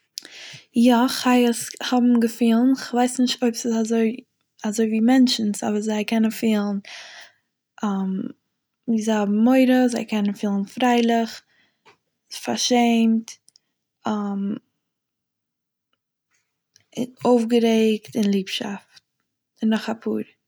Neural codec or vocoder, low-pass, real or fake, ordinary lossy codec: none; none; real; none